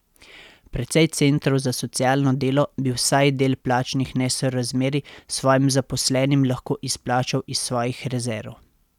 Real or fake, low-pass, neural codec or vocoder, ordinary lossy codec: real; 19.8 kHz; none; none